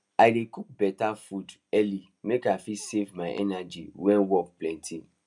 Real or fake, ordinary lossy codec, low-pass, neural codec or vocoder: real; none; 10.8 kHz; none